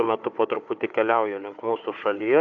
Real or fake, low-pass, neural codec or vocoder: fake; 7.2 kHz; codec, 16 kHz, 4 kbps, FunCodec, trained on Chinese and English, 50 frames a second